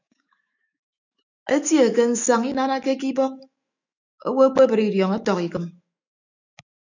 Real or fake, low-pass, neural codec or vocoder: fake; 7.2 kHz; autoencoder, 48 kHz, 128 numbers a frame, DAC-VAE, trained on Japanese speech